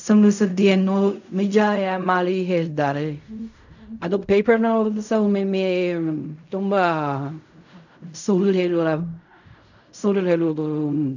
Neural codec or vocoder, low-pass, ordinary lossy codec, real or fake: codec, 16 kHz in and 24 kHz out, 0.4 kbps, LongCat-Audio-Codec, fine tuned four codebook decoder; 7.2 kHz; none; fake